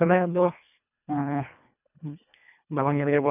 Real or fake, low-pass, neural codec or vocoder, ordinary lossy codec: fake; 3.6 kHz; codec, 24 kHz, 1.5 kbps, HILCodec; none